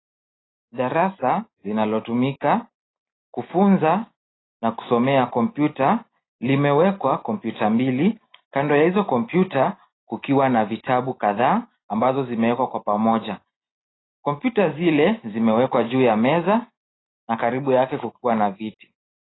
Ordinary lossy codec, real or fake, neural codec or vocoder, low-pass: AAC, 16 kbps; real; none; 7.2 kHz